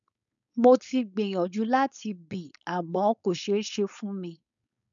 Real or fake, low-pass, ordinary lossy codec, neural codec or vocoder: fake; 7.2 kHz; none; codec, 16 kHz, 4.8 kbps, FACodec